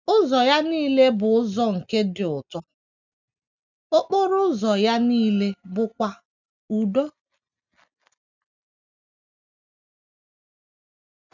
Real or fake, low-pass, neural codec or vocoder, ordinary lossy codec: real; 7.2 kHz; none; none